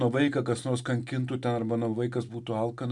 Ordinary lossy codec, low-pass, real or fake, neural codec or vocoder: MP3, 96 kbps; 10.8 kHz; real; none